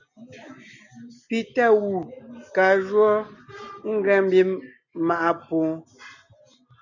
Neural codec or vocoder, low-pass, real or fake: none; 7.2 kHz; real